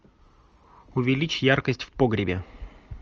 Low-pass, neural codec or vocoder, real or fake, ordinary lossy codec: 7.2 kHz; none; real; Opus, 24 kbps